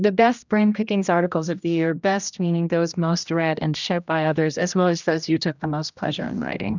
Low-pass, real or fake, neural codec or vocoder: 7.2 kHz; fake; codec, 16 kHz, 1 kbps, X-Codec, HuBERT features, trained on general audio